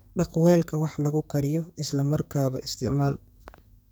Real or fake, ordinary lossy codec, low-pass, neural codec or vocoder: fake; none; none; codec, 44.1 kHz, 2.6 kbps, SNAC